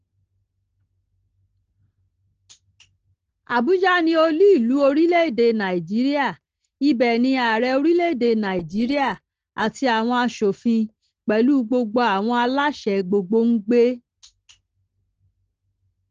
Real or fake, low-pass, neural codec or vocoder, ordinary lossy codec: real; 7.2 kHz; none; Opus, 16 kbps